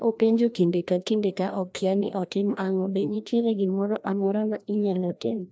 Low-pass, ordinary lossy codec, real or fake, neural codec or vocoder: none; none; fake; codec, 16 kHz, 1 kbps, FreqCodec, larger model